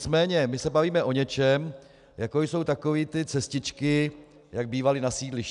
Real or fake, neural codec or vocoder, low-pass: real; none; 10.8 kHz